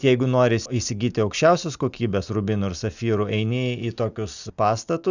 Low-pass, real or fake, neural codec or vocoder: 7.2 kHz; real; none